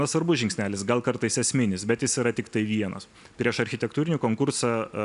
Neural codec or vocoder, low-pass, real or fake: none; 10.8 kHz; real